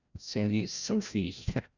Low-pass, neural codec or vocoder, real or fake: 7.2 kHz; codec, 16 kHz, 0.5 kbps, FreqCodec, larger model; fake